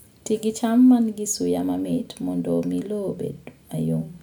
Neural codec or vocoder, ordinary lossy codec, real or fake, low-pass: none; none; real; none